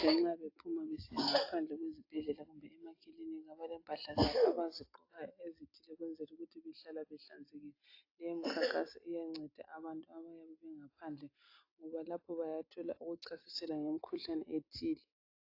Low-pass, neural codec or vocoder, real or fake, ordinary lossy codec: 5.4 kHz; none; real; AAC, 32 kbps